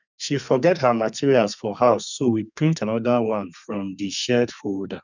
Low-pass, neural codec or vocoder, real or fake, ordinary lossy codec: 7.2 kHz; codec, 32 kHz, 1.9 kbps, SNAC; fake; none